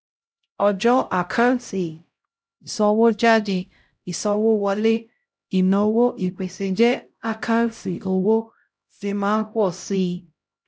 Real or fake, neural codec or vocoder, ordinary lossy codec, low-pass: fake; codec, 16 kHz, 0.5 kbps, X-Codec, HuBERT features, trained on LibriSpeech; none; none